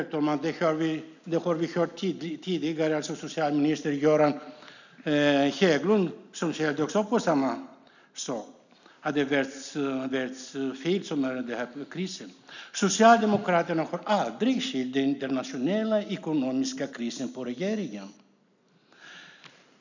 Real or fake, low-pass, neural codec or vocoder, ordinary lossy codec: real; 7.2 kHz; none; none